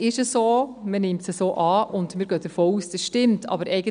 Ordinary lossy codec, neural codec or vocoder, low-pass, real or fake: none; none; 9.9 kHz; real